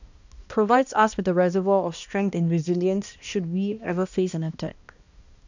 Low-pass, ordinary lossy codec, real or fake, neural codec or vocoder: 7.2 kHz; none; fake; codec, 16 kHz, 1 kbps, X-Codec, HuBERT features, trained on balanced general audio